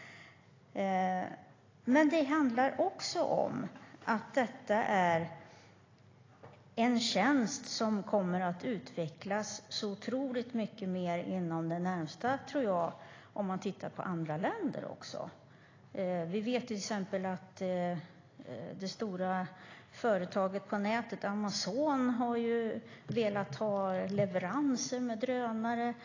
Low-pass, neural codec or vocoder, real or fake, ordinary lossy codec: 7.2 kHz; none; real; AAC, 32 kbps